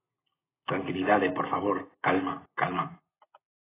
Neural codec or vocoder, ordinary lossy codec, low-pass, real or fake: none; AAC, 16 kbps; 3.6 kHz; real